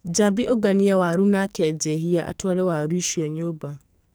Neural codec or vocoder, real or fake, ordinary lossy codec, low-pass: codec, 44.1 kHz, 2.6 kbps, SNAC; fake; none; none